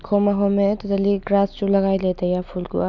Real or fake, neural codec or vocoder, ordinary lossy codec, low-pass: real; none; none; 7.2 kHz